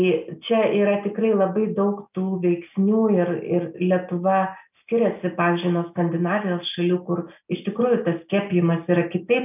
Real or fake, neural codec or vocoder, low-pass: real; none; 3.6 kHz